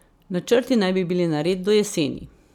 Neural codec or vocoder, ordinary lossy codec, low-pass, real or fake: none; none; 19.8 kHz; real